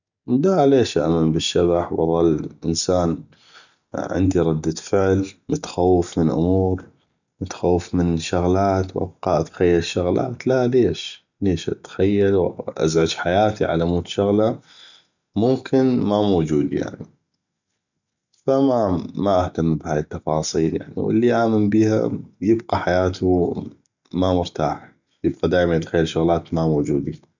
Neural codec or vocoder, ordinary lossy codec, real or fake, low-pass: none; none; real; 7.2 kHz